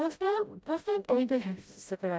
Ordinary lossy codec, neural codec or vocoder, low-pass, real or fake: none; codec, 16 kHz, 0.5 kbps, FreqCodec, smaller model; none; fake